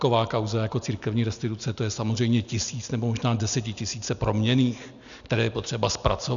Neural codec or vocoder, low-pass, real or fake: none; 7.2 kHz; real